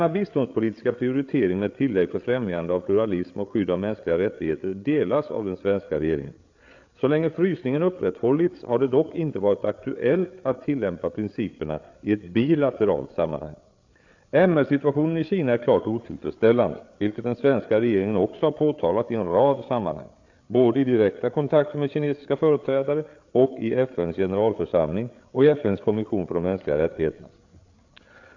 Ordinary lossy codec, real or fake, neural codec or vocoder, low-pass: AAC, 48 kbps; fake; codec, 16 kHz, 8 kbps, FreqCodec, larger model; 7.2 kHz